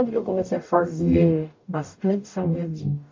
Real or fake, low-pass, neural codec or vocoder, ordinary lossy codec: fake; 7.2 kHz; codec, 44.1 kHz, 0.9 kbps, DAC; MP3, 48 kbps